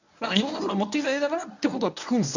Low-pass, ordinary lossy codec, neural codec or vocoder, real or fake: 7.2 kHz; none; codec, 24 kHz, 0.9 kbps, WavTokenizer, medium speech release version 1; fake